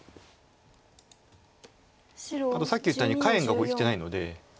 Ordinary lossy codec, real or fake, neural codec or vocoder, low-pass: none; real; none; none